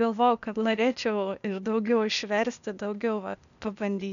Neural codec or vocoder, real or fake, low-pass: codec, 16 kHz, 0.8 kbps, ZipCodec; fake; 7.2 kHz